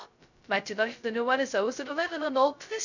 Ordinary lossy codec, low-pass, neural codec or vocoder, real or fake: none; 7.2 kHz; codec, 16 kHz, 0.2 kbps, FocalCodec; fake